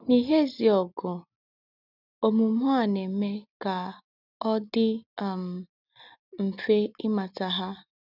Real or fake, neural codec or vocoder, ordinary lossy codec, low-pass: real; none; none; 5.4 kHz